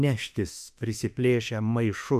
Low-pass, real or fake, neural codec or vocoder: 14.4 kHz; fake; autoencoder, 48 kHz, 32 numbers a frame, DAC-VAE, trained on Japanese speech